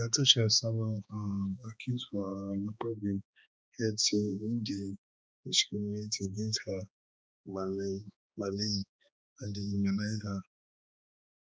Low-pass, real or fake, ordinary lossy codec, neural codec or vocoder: none; fake; none; codec, 16 kHz, 2 kbps, X-Codec, HuBERT features, trained on balanced general audio